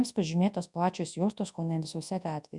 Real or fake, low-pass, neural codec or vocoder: fake; 10.8 kHz; codec, 24 kHz, 0.9 kbps, WavTokenizer, large speech release